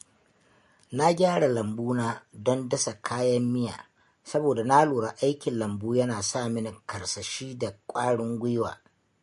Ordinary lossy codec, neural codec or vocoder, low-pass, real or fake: MP3, 48 kbps; none; 14.4 kHz; real